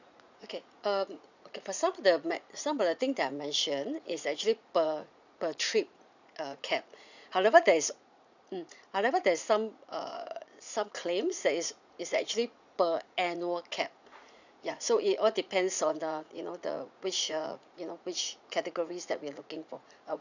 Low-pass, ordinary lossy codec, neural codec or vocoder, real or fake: 7.2 kHz; none; vocoder, 44.1 kHz, 80 mel bands, Vocos; fake